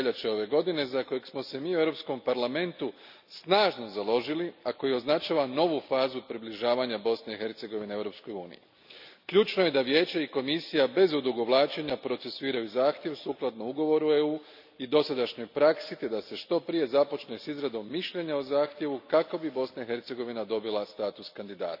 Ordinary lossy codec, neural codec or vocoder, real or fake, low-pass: none; none; real; 5.4 kHz